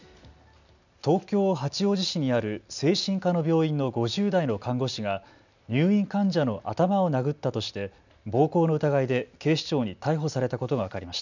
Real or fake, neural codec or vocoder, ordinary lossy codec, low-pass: real; none; none; 7.2 kHz